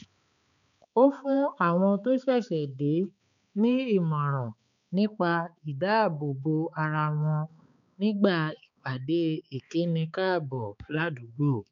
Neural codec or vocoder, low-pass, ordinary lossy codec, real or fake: codec, 16 kHz, 4 kbps, X-Codec, HuBERT features, trained on balanced general audio; 7.2 kHz; none; fake